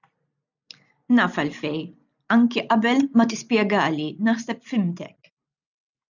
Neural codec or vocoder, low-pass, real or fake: none; 7.2 kHz; real